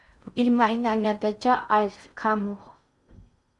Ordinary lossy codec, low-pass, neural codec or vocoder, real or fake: Opus, 64 kbps; 10.8 kHz; codec, 16 kHz in and 24 kHz out, 0.6 kbps, FocalCodec, streaming, 4096 codes; fake